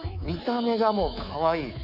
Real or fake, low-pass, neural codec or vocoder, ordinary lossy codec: fake; 5.4 kHz; codec, 24 kHz, 3.1 kbps, DualCodec; none